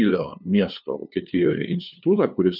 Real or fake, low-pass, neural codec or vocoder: fake; 5.4 kHz; codec, 16 kHz, 2 kbps, FunCodec, trained on LibriTTS, 25 frames a second